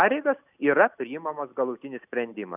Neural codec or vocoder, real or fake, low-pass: none; real; 3.6 kHz